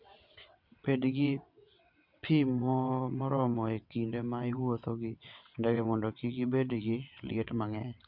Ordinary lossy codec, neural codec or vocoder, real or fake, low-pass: MP3, 48 kbps; vocoder, 22.05 kHz, 80 mel bands, WaveNeXt; fake; 5.4 kHz